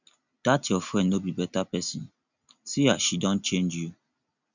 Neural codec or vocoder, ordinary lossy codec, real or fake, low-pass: none; none; real; 7.2 kHz